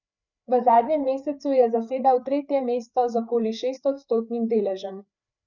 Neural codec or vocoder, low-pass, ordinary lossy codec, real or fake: codec, 16 kHz, 4 kbps, FreqCodec, larger model; 7.2 kHz; none; fake